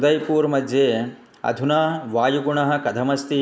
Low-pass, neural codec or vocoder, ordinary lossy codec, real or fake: none; none; none; real